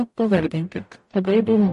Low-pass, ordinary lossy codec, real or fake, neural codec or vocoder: 14.4 kHz; MP3, 48 kbps; fake; codec, 44.1 kHz, 0.9 kbps, DAC